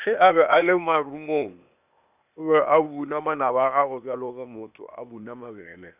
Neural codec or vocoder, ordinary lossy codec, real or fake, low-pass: codec, 16 kHz, 0.8 kbps, ZipCodec; none; fake; 3.6 kHz